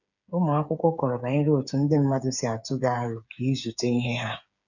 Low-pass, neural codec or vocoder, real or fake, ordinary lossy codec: 7.2 kHz; codec, 16 kHz, 8 kbps, FreqCodec, smaller model; fake; none